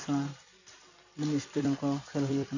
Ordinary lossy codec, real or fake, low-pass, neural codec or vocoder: none; fake; 7.2 kHz; vocoder, 44.1 kHz, 128 mel bands every 256 samples, BigVGAN v2